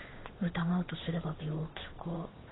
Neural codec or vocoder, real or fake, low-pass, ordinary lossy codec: codec, 16 kHz, 8 kbps, FunCodec, trained on Chinese and English, 25 frames a second; fake; 7.2 kHz; AAC, 16 kbps